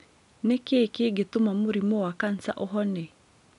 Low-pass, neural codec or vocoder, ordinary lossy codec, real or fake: 10.8 kHz; none; none; real